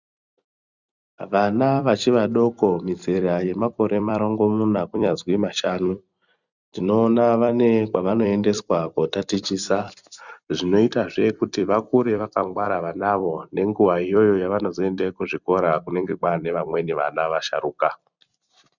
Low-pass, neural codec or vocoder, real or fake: 7.2 kHz; vocoder, 44.1 kHz, 128 mel bands every 256 samples, BigVGAN v2; fake